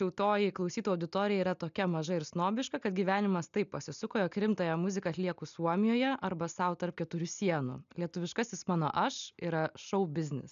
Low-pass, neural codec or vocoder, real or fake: 7.2 kHz; none; real